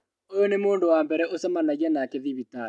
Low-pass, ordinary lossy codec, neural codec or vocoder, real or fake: none; none; none; real